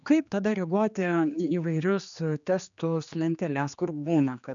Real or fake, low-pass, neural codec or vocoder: fake; 7.2 kHz; codec, 16 kHz, 2 kbps, X-Codec, HuBERT features, trained on general audio